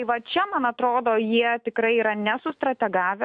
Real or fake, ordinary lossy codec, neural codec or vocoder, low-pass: fake; MP3, 64 kbps; autoencoder, 48 kHz, 128 numbers a frame, DAC-VAE, trained on Japanese speech; 9.9 kHz